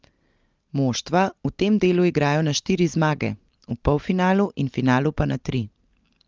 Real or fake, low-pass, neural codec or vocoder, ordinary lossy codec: real; 7.2 kHz; none; Opus, 24 kbps